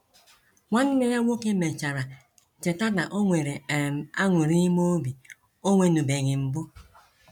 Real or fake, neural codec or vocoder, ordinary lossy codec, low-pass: real; none; none; 19.8 kHz